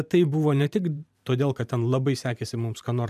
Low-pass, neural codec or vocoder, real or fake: 14.4 kHz; vocoder, 44.1 kHz, 128 mel bands every 512 samples, BigVGAN v2; fake